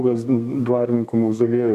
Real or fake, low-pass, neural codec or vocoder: fake; 14.4 kHz; codec, 32 kHz, 1.9 kbps, SNAC